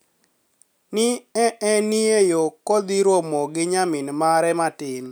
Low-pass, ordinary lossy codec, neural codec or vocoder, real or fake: none; none; none; real